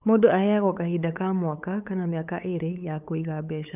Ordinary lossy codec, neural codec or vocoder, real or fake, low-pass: none; codec, 16 kHz, 8 kbps, FunCodec, trained on LibriTTS, 25 frames a second; fake; 3.6 kHz